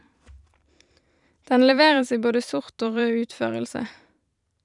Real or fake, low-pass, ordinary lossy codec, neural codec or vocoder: fake; 10.8 kHz; none; vocoder, 44.1 kHz, 128 mel bands every 512 samples, BigVGAN v2